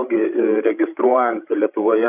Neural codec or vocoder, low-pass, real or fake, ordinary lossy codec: codec, 16 kHz, 16 kbps, FreqCodec, larger model; 3.6 kHz; fake; AAC, 32 kbps